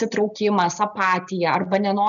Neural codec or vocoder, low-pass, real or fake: none; 7.2 kHz; real